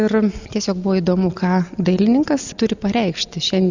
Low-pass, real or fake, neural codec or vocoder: 7.2 kHz; real; none